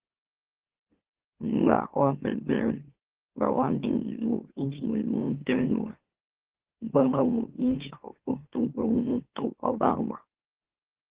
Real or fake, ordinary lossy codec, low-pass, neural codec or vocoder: fake; Opus, 16 kbps; 3.6 kHz; autoencoder, 44.1 kHz, a latent of 192 numbers a frame, MeloTTS